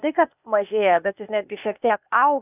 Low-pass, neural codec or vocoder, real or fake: 3.6 kHz; codec, 16 kHz, 0.8 kbps, ZipCodec; fake